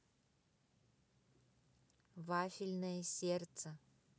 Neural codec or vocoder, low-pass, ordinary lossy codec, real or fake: none; none; none; real